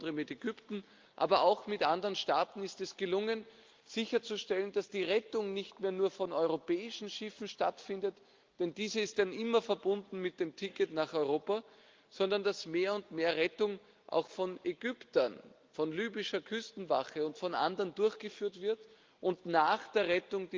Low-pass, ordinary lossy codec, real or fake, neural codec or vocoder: 7.2 kHz; Opus, 32 kbps; real; none